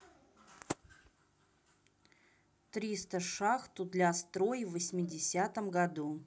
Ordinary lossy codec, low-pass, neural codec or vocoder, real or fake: none; none; none; real